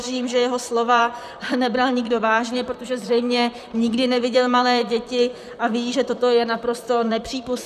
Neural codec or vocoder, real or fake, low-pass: vocoder, 44.1 kHz, 128 mel bands, Pupu-Vocoder; fake; 14.4 kHz